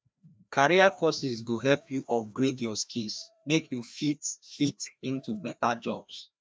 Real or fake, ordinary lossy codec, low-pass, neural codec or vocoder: fake; none; none; codec, 16 kHz, 1 kbps, FreqCodec, larger model